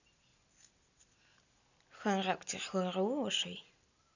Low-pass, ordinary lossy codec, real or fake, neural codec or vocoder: 7.2 kHz; none; real; none